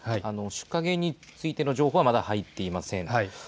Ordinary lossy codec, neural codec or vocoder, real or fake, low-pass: none; none; real; none